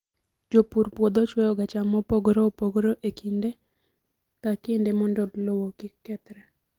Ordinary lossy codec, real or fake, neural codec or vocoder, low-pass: Opus, 24 kbps; real; none; 19.8 kHz